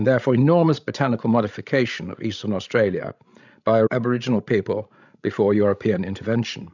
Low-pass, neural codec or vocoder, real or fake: 7.2 kHz; codec, 16 kHz, 16 kbps, FreqCodec, larger model; fake